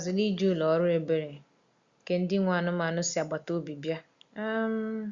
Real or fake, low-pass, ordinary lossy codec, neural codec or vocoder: real; 7.2 kHz; Opus, 64 kbps; none